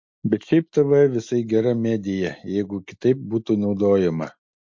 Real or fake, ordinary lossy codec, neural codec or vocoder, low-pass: real; MP3, 48 kbps; none; 7.2 kHz